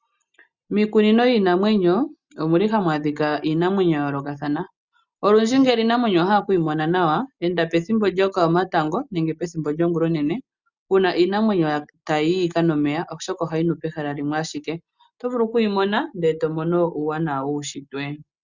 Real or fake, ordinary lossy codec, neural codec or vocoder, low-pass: real; Opus, 64 kbps; none; 7.2 kHz